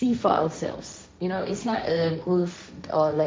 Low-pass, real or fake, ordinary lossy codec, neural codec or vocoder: none; fake; none; codec, 16 kHz, 1.1 kbps, Voila-Tokenizer